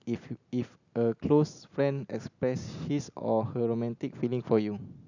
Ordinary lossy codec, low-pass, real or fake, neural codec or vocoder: none; 7.2 kHz; real; none